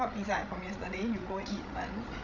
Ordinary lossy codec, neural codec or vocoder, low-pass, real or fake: none; codec, 16 kHz, 16 kbps, FreqCodec, larger model; 7.2 kHz; fake